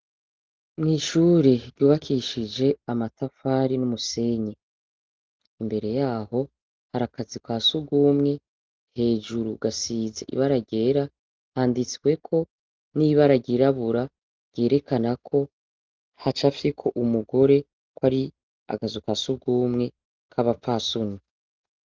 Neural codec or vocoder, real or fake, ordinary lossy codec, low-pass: none; real; Opus, 16 kbps; 7.2 kHz